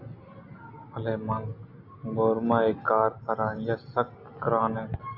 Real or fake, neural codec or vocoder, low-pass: real; none; 5.4 kHz